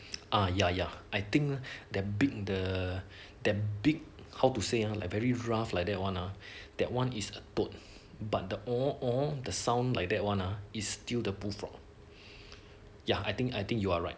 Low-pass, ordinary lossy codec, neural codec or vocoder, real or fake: none; none; none; real